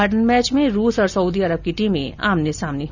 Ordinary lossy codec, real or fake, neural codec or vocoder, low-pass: none; real; none; 7.2 kHz